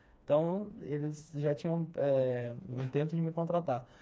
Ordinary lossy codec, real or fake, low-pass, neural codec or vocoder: none; fake; none; codec, 16 kHz, 2 kbps, FreqCodec, smaller model